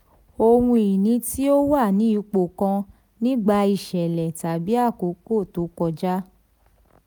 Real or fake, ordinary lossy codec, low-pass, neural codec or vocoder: real; none; none; none